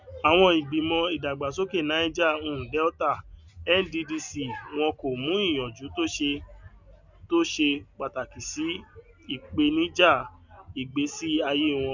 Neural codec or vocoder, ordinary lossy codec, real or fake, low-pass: none; none; real; 7.2 kHz